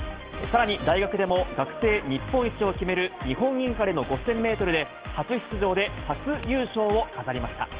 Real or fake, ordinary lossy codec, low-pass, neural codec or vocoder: real; Opus, 16 kbps; 3.6 kHz; none